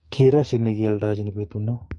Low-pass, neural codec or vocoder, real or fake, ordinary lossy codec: 10.8 kHz; codec, 44.1 kHz, 2.6 kbps, SNAC; fake; MP3, 48 kbps